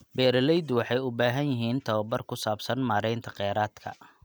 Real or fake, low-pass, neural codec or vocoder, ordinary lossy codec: real; none; none; none